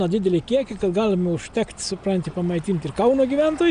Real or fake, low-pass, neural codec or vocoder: real; 9.9 kHz; none